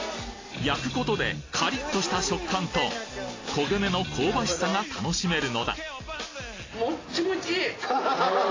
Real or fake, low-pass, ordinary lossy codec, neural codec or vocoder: real; 7.2 kHz; AAC, 32 kbps; none